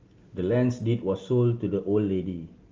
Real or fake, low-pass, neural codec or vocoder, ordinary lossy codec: real; 7.2 kHz; none; Opus, 32 kbps